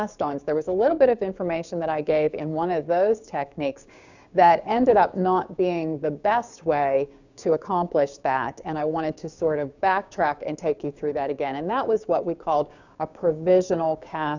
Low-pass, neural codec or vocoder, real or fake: 7.2 kHz; codec, 16 kHz, 6 kbps, DAC; fake